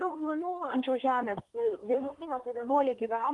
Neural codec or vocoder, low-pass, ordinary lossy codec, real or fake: codec, 24 kHz, 1 kbps, SNAC; 10.8 kHz; Opus, 24 kbps; fake